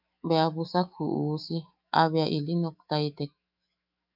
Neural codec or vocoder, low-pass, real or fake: autoencoder, 48 kHz, 128 numbers a frame, DAC-VAE, trained on Japanese speech; 5.4 kHz; fake